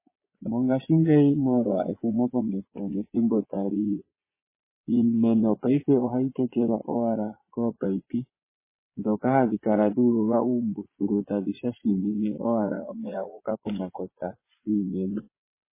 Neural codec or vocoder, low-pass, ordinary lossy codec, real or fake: vocoder, 22.05 kHz, 80 mel bands, Vocos; 3.6 kHz; MP3, 16 kbps; fake